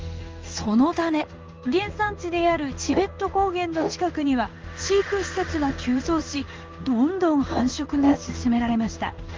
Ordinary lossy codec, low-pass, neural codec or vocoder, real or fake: Opus, 24 kbps; 7.2 kHz; codec, 16 kHz in and 24 kHz out, 1 kbps, XY-Tokenizer; fake